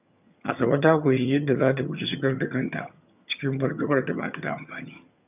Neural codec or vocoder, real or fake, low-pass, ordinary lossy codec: vocoder, 22.05 kHz, 80 mel bands, HiFi-GAN; fake; 3.6 kHz; none